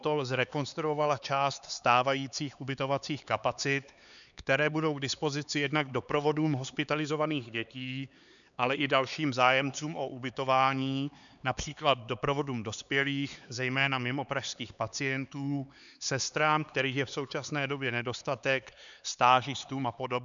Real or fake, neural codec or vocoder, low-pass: fake; codec, 16 kHz, 4 kbps, X-Codec, HuBERT features, trained on LibriSpeech; 7.2 kHz